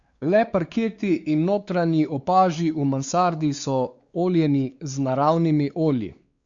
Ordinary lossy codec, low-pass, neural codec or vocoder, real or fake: Opus, 64 kbps; 7.2 kHz; codec, 16 kHz, 4 kbps, X-Codec, WavLM features, trained on Multilingual LibriSpeech; fake